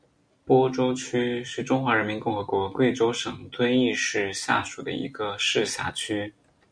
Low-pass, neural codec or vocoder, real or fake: 9.9 kHz; none; real